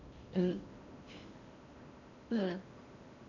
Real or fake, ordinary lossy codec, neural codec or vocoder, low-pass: fake; none; codec, 16 kHz in and 24 kHz out, 0.8 kbps, FocalCodec, streaming, 65536 codes; 7.2 kHz